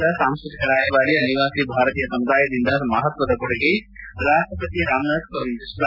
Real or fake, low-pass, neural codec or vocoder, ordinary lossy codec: real; 5.4 kHz; none; none